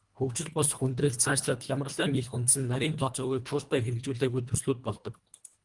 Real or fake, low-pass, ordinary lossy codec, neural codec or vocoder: fake; 10.8 kHz; Opus, 32 kbps; codec, 24 kHz, 1.5 kbps, HILCodec